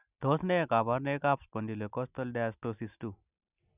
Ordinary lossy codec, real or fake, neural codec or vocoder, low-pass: none; real; none; 3.6 kHz